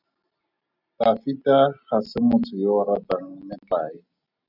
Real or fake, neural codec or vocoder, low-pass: real; none; 5.4 kHz